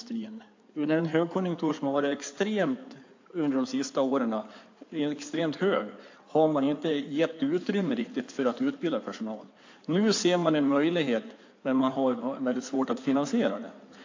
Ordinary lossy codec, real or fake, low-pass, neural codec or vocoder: AAC, 48 kbps; fake; 7.2 kHz; codec, 16 kHz in and 24 kHz out, 2.2 kbps, FireRedTTS-2 codec